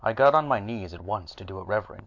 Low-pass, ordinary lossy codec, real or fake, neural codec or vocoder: 7.2 kHz; MP3, 64 kbps; real; none